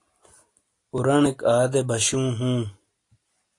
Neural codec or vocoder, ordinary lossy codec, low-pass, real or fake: none; AAC, 48 kbps; 10.8 kHz; real